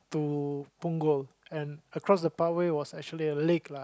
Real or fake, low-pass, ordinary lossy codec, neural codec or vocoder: real; none; none; none